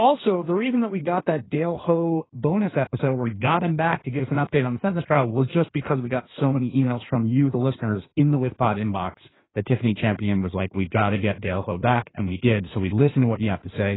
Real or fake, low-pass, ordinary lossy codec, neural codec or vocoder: fake; 7.2 kHz; AAC, 16 kbps; codec, 16 kHz in and 24 kHz out, 1.1 kbps, FireRedTTS-2 codec